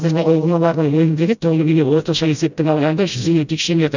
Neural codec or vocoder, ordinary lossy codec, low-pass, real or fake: codec, 16 kHz, 0.5 kbps, FreqCodec, smaller model; none; 7.2 kHz; fake